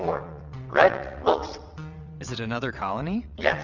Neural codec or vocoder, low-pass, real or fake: vocoder, 22.05 kHz, 80 mel bands, WaveNeXt; 7.2 kHz; fake